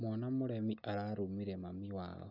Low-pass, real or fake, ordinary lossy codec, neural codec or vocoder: 5.4 kHz; real; none; none